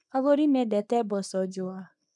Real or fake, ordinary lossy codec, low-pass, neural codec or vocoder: fake; none; 10.8 kHz; codec, 24 kHz, 0.9 kbps, WavTokenizer, small release